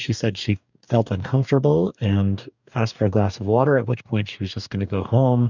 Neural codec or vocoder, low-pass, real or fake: codec, 44.1 kHz, 2.6 kbps, DAC; 7.2 kHz; fake